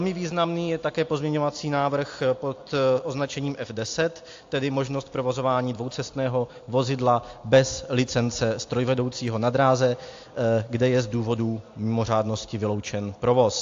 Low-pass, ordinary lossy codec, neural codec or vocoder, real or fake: 7.2 kHz; AAC, 48 kbps; none; real